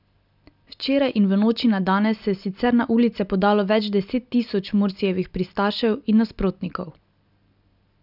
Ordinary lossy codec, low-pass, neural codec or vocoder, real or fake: none; 5.4 kHz; none; real